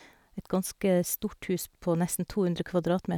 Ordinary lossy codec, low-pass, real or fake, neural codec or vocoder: none; 19.8 kHz; real; none